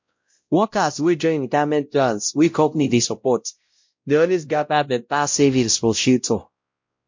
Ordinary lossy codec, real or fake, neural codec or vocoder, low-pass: MP3, 48 kbps; fake; codec, 16 kHz, 0.5 kbps, X-Codec, WavLM features, trained on Multilingual LibriSpeech; 7.2 kHz